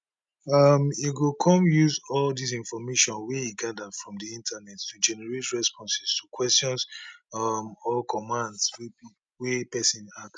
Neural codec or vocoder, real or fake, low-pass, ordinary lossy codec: none; real; none; none